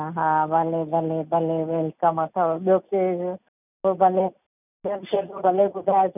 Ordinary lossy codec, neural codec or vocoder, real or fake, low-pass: none; none; real; 3.6 kHz